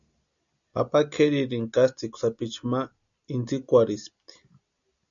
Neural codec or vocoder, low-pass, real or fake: none; 7.2 kHz; real